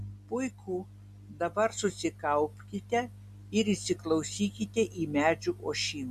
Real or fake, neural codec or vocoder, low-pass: real; none; 14.4 kHz